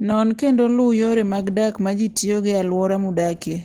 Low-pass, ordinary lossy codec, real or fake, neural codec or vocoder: 19.8 kHz; Opus, 16 kbps; real; none